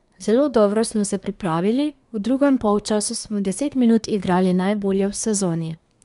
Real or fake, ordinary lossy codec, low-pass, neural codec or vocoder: fake; none; 10.8 kHz; codec, 24 kHz, 1 kbps, SNAC